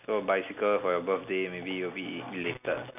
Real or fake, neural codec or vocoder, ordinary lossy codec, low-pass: real; none; none; 3.6 kHz